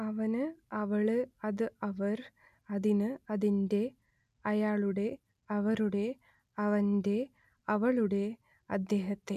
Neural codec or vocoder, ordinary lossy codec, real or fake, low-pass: none; none; real; 14.4 kHz